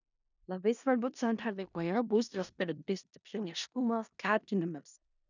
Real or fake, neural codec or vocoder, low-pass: fake; codec, 16 kHz in and 24 kHz out, 0.4 kbps, LongCat-Audio-Codec, four codebook decoder; 7.2 kHz